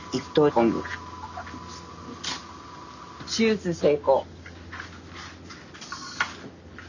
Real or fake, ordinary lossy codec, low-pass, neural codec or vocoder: real; none; 7.2 kHz; none